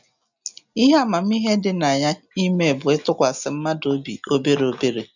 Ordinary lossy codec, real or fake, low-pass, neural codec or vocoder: none; real; 7.2 kHz; none